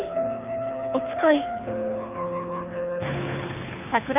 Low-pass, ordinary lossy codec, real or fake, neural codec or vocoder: 3.6 kHz; none; fake; codec, 24 kHz, 6 kbps, HILCodec